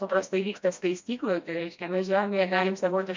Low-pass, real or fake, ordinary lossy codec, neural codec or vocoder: 7.2 kHz; fake; MP3, 64 kbps; codec, 16 kHz, 1 kbps, FreqCodec, smaller model